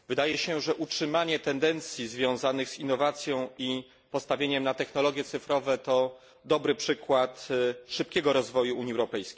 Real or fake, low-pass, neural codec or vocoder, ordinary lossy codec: real; none; none; none